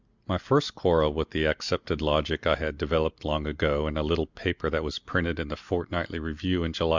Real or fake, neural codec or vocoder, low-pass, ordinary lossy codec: real; none; 7.2 kHz; Opus, 64 kbps